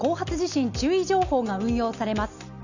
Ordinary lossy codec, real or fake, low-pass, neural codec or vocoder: none; real; 7.2 kHz; none